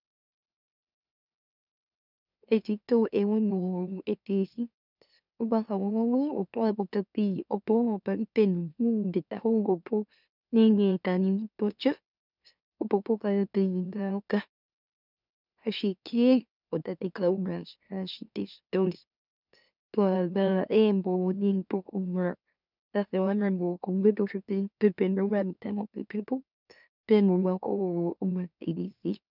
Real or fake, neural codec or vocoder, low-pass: fake; autoencoder, 44.1 kHz, a latent of 192 numbers a frame, MeloTTS; 5.4 kHz